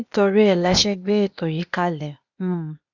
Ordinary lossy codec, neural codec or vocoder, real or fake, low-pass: none; codec, 16 kHz, 0.8 kbps, ZipCodec; fake; 7.2 kHz